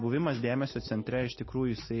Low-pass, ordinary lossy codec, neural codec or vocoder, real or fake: 7.2 kHz; MP3, 24 kbps; none; real